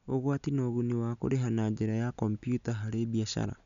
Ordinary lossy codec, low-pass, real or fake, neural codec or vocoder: none; 7.2 kHz; real; none